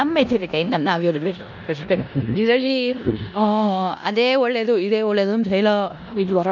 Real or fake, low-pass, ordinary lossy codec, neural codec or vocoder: fake; 7.2 kHz; none; codec, 16 kHz in and 24 kHz out, 0.9 kbps, LongCat-Audio-Codec, four codebook decoder